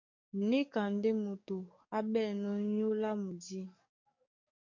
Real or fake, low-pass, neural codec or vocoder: fake; 7.2 kHz; codec, 44.1 kHz, 7.8 kbps, DAC